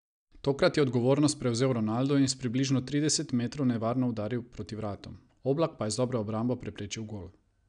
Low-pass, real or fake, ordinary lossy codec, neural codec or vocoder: 9.9 kHz; real; none; none